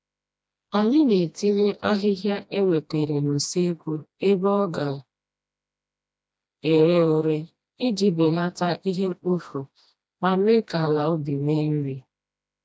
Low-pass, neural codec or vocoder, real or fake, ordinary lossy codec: none; codec, 16 kHz, 1 kbps, FreqCodec, smaller model; fake; none